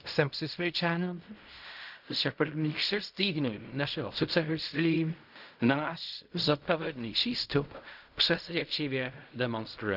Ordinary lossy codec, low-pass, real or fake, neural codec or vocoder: none; 5.4 kHz; fake; codec, 16 kHz in and 24 kHz out, 0.4 kbps, LongCat-Audio-Codec, fine tuned four codebook decoder